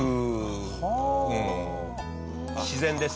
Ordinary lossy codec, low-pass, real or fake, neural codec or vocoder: none; none; real; none